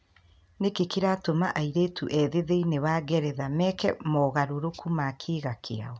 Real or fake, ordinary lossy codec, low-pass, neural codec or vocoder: real; none; none; none